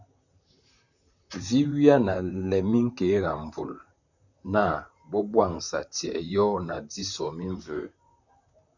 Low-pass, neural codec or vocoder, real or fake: 7.2 kHz; vocoder, 44.1 kHz, 128 mel bands, Pupu-Vocoder; fake